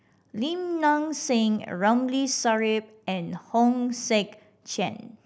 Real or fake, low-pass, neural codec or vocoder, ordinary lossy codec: real; none; none; none